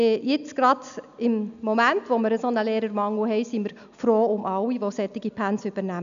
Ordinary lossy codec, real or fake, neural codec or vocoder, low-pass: none; real; none; 7.2 kHz